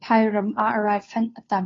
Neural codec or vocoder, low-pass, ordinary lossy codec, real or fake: codec, 16 kHz, 2 kbps, FunCodec, trained on Chinese and English, 25 frames a second; 7.2 kHz; AAC, 32 kbps; fake